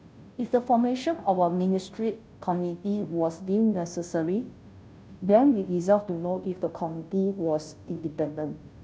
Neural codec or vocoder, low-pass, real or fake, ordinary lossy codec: codec, 16 kHz, 0.5 kbps, FunCodec, trained on Chinese and English, 25 frames a second; none; fake; none